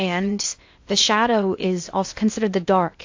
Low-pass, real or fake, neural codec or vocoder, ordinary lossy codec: 7.2 kHz; fake; codec, 16 kHz in and 24 kHz out, 0.6 kbps, FocalCodec, streaming, 2048 codes; AAC, 48 kbps